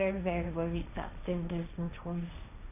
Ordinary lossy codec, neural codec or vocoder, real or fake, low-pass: none; codec, 16 kHz, 1.1 kbps, Voila-Tokenizer; fake; 3.6 kHz